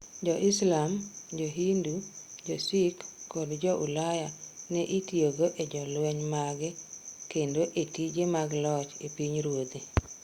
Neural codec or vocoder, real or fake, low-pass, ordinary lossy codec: none; real; 19.8 kHz; Opus, 64 kbps